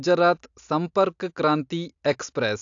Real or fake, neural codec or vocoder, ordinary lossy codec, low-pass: real; none; AAC, 64 kbps; 7.2 kHz